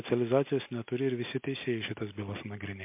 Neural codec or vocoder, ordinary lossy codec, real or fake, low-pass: none; MP3, 32 kbps; real; 3.6 kHz